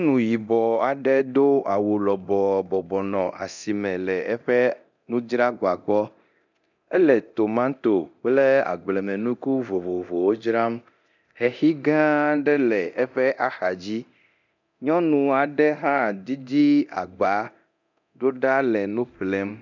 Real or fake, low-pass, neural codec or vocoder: fake; 7.2 kHz; codec, 24 kHz, 0.9 kbps, DualCodec